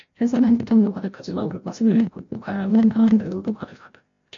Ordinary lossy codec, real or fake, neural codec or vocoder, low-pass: MP3, 48 kbps; fake; codec, 16 kHz, 0.5 kbps, FreqCodec, larger model; 7.2 kHz